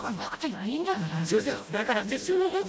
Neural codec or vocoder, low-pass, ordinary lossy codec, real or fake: codec, 16 kHz, 0.5 kbps, FreqCodec, smaller model; none; none; fake